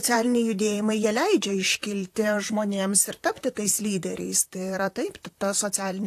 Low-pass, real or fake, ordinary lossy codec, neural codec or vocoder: 14.4 kHz; fake; AAC, 64 kbps; vocoder, 44.1 kHz, 128 mel bands, Pupu-Vocoder